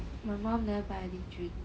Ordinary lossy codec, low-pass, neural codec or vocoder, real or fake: none; none; none; real